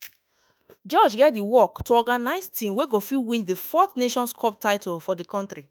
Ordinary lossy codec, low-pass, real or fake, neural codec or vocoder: none; none; fake; autoencoder, 48 kHz, 32 numbers a frame, DAC-VAE, trained on Japanese speech